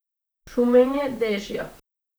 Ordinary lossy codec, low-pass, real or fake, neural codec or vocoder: none; none; fake; vocoder, 44.1 kHz, 128 mel bands, Pupu-Vocoder